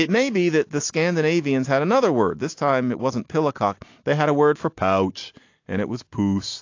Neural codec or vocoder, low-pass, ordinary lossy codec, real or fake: none; 7.2 kHz; AAC, 48 kbps; real